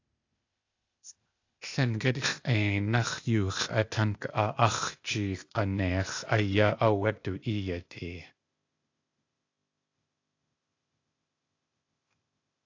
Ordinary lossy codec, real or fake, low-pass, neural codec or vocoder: AAC, 48 kbps; fake; 7.2 kHz; codec, 16 kHz, 0.8 kbps, ZipCodec